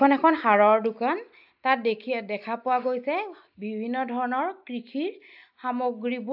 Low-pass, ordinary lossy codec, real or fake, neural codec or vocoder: 5.4 kHz; AAC, 48 kbps; real; none